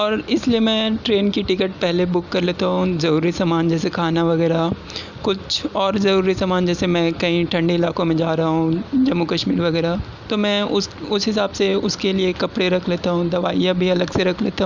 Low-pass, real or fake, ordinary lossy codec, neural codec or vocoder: 7.2 kHz; real; none; none